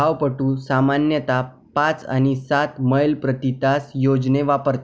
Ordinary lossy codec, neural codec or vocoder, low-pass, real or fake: none; none; none; real